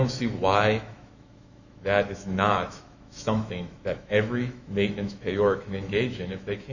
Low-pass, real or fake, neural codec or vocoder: 7.2 kHz; fake; autoencoder, 48 kHz, 128 numbers a frame, DAC-VAE, trained on Japanese speech